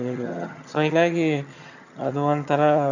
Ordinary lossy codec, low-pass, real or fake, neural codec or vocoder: none; 7.2 kHz; fake; vocoder, 22.05 kHz, 80 mel bands, HiFi-GAN